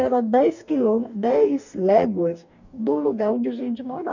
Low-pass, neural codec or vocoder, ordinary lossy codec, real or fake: 7.2 kHz; codec, 44.1 kHz, 2.6 kbps, DAC; none; fake